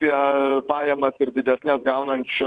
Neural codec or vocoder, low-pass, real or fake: vocoder, 22.05 kHz, 80 mel bands, WaveNeXt; 9.9 kHz; fake